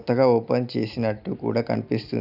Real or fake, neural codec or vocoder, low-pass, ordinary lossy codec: real; none; 5.4 kHz; none